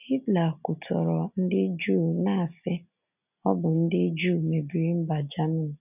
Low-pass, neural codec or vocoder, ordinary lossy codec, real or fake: 3.6 kHz; none; none; real